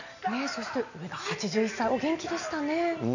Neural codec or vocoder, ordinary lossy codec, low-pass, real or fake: none; none; 7.2 kHz; real